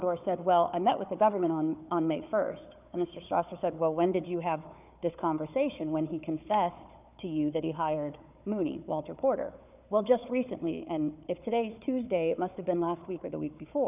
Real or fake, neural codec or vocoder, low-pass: fake; codec, 16 kHz, 4 kbps, FunCodec, trained on Chinese and English, 50 frames a second; 3.6 kHz